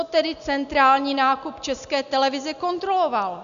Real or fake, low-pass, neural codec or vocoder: real; 7.2 kHz; none